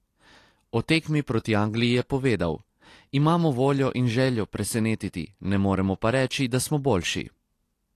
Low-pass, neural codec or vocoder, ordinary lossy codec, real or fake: 14.4 kHz; none; AAC, 48 kbps; real